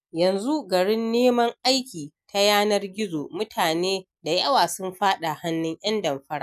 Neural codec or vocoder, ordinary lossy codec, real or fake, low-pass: none; none; real; 14.4 kHz